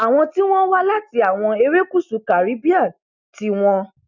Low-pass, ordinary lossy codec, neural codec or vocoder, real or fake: 7.2 kHz; none; none; real